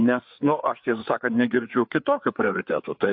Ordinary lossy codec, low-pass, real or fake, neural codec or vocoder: MP3, 48 kbps; 5.4 kHz; fake; codec, 16 kHz, 4 kbps, FreqCodec, larger model